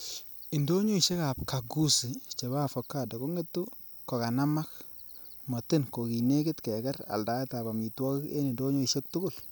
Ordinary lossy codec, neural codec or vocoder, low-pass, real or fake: none; none; none; real